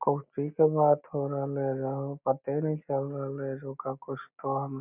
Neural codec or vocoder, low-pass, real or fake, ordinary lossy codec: none; 3.6 kHz; real; none